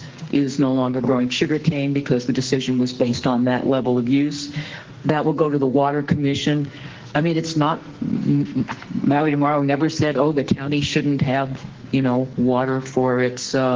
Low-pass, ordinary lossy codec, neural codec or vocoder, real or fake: 7.2 kHz; Opus, 16 kbps; codec, 44.1 kHz, 2.6 kbps, SNAC; fake